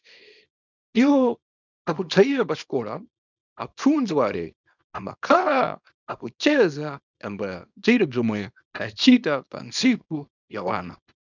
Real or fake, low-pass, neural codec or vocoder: fake; 7.2 kHz; codec, 24 kHz, 0.9 kbps, WavTokenizer, small release